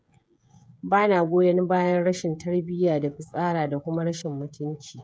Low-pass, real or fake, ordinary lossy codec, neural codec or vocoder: none; fake; none; codec, 16 kHz, 16 kbps, FreqCodec, smaller model